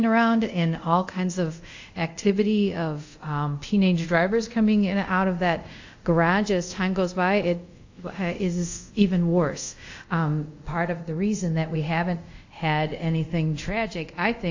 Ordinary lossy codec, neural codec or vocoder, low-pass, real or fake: AAC, 48 kbps; codec, 24 kHz, 0.5 kbps, DualCodec; 7.2 kHz; fake